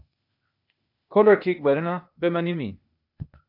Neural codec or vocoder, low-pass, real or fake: codec, 16 kHz, 0.8 kbps, ZipCodec; 5.4 kHz; fake